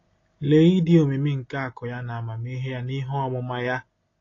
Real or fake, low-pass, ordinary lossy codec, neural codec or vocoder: real; 7.2 kHz; AAC, 32 kbps; none